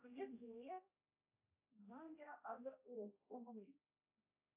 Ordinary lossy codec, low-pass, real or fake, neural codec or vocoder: AAC, 32 kbps; 3.6 kHz; fake; codec, 16 kHz, 0.5 kbps, X-Codec, HuBERT features, trained on general audio